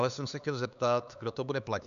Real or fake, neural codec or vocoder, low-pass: fake; codec, 16 kHz, 4 kbps, X-Codec, HuBERT features, trained on LibriSpeech; 7.2 kHz